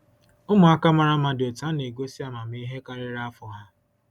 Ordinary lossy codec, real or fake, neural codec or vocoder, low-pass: none; real; none; 19.8 kHz